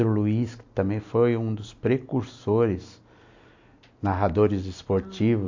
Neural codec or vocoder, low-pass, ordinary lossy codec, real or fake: none; 7.2 kHz; AAC, 48 kbps; real